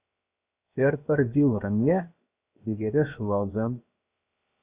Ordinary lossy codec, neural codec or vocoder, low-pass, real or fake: Opus, 64 kbps; codec, 16 kHz, 0.7 kbps, FocalCodec; 3.6 kHz; fake